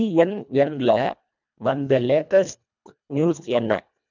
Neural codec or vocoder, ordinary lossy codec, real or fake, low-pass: codec, 24 kHz, 1.5 kbps, HILCodec; none; fake; 7.2 kHz